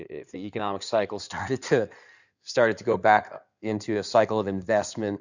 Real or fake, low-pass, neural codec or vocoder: fake; 7.2 kHz; codec, 16 kHz in and 24 kHz out, 2.2 kbps, FireRedTTS-2 codec